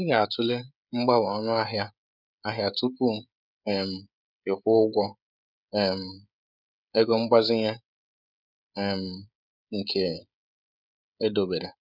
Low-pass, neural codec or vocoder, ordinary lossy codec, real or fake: 5.4 kHz; codec, 16 kHz, 16 kbps, FreqCodec, smaller model; none; fake